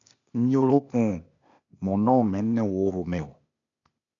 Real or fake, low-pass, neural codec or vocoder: fake; 7.2 kHz; codec, 16 kHz, 0.8 kbps, ZipCodec